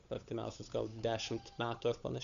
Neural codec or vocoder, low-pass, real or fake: codec, 16 kHz, 8 kbps, FunCodec, trained on Chinese and English, 25 frames a second; 7.2 kHz; fake